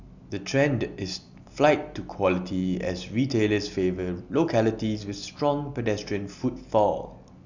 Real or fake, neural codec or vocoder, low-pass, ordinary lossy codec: real; none; 7.2 kHz; none